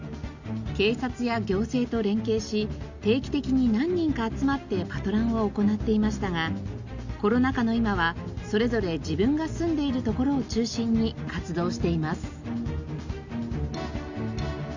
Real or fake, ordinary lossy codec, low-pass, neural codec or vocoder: real; Opus, 64 kbps; 7.2 kHz; none